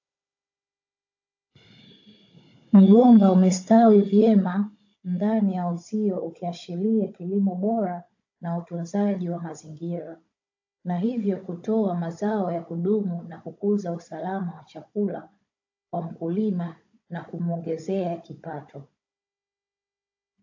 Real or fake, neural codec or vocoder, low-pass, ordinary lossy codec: fake; codec, 16 kHz, 16 kbps, FunCodec, trained on Chinese and English, 50 frames a second; 7.2 kHz; AAC, 48 kbps